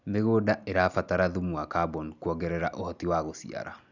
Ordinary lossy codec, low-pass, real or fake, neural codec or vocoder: none; 7.2 kHz; real; none